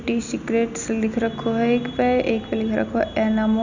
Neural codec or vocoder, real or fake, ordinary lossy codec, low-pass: none; real; none; 7.2 kHz